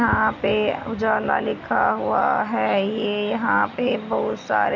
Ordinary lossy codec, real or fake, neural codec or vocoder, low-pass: none; real; none; 7.2 kHz